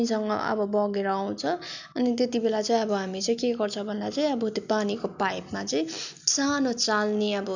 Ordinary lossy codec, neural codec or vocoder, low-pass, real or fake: none; none; 7.2 kHz; real